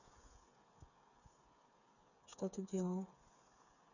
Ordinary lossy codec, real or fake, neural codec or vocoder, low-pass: none; fake; codec, 16 kHz, 8 kbps, FreqCodec, smaller model; 7.2 kHz